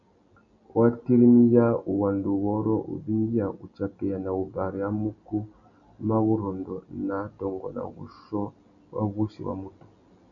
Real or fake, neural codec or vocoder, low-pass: real; none; 7.2 kHz